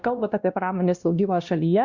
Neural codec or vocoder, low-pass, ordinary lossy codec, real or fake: codec, 16 kHz, 1 kbps, X-Codec, WavLM features, trained on Multilingual LibriSpeech; 7.2 kHz; Opus, 64 kbps; fake